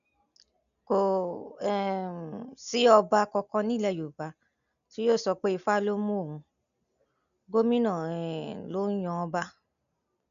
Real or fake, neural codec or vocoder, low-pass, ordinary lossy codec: real; none; 7.2 kHz; none